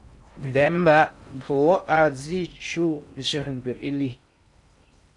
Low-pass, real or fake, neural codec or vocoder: 10.8 kHz; fake; codec, 16 kHz in and 24 kHz out, 0.6 kbps, FocalCodec, streaming, 4096 codes